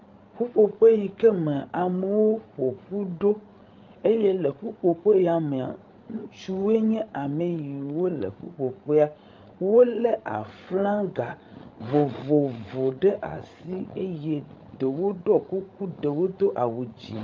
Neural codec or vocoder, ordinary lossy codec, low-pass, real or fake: codec, 16 kHz, 16 kbps, FreqCodec, larger model; Opus, 32 kbps; 7.2 kHz; fake